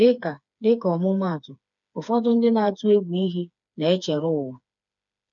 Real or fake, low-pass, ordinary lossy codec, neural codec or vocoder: fake; 7.2 kHz; none; codec, 16 kHz, 4 kbps, FreqCodec, smaller model